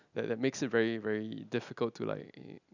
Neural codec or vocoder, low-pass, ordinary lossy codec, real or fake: none; 7.2 kHz; none; real